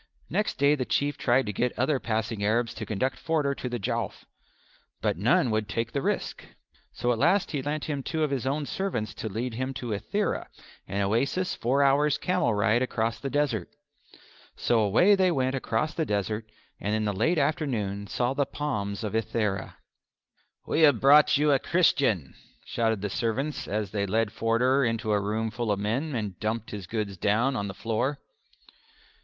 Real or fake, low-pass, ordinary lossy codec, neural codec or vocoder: real; 7.2 kHz; Opus, 32 kbps; none